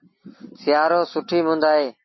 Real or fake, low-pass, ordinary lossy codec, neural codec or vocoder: real; 7.2 kHz; MP3, 24 kbps; none